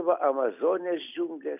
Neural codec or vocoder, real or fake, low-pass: none; real; 3.6 kHz